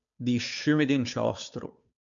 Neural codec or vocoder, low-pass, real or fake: codec, 16 kHz, 2 kbps, FunCodec, trained on Chinese and English, 25 frames a second; 7.2 kHz; fake